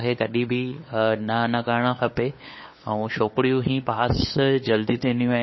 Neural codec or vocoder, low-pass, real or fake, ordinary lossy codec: codec, 16 kHz, 8 kbps, FunCodec, trained on LibriTTS, 25 frames a second; 7.2 kHz; fake; MP3, 24 kbps